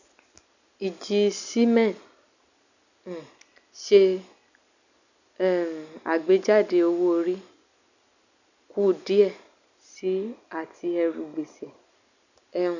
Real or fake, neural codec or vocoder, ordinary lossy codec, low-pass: real; none; none; 7.2 kHz